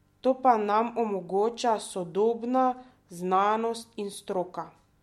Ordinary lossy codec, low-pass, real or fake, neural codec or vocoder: MP3, 64 kbps; 19.8 kHz; real; none